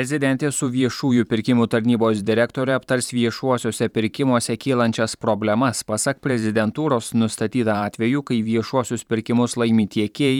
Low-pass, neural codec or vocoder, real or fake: 19.8 kHz; vocoder, 44.1 kHz, 128 mel bands every 512 samples, BigVGAN v2; fake